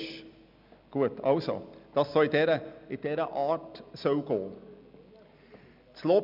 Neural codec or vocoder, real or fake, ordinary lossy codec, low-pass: none; real; none; 5.4 kHz